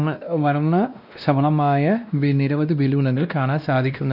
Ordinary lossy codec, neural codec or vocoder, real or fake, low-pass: none; codec, 16 kHz, 1 kbps, X-Codec, WavLM features, trained on Multilingual LibriSpeech; fake; 5.4 kHz